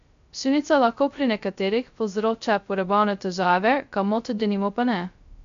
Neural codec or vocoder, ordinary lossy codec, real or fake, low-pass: codec, 16 kHz, 0.2 kbps, FocalCodec; MP3, 64 kbps; fake; 7.2 kHz